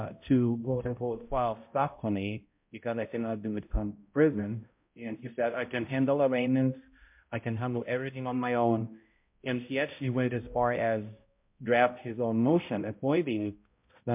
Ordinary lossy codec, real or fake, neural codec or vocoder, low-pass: MP3, 32 kbps; fake; codec, 16 kHz, 0.5 kbps, X-Codec, HuBERT features, trained on balanced general audio; 3.6 kHz